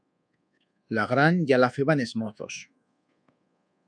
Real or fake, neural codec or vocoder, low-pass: fake; codec, 24 kHz, 1.2 kbps, DualCodec; 9.9 kHz